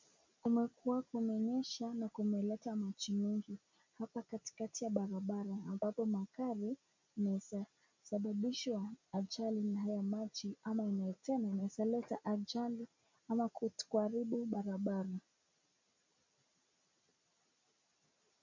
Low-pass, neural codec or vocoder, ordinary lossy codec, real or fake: 7.2 kHz; none; MP3, 64 kbps; real